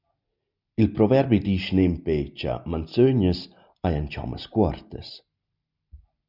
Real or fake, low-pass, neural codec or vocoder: real; 5.4 kHz; none